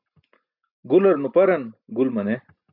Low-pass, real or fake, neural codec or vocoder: 5.4 kHz; real; none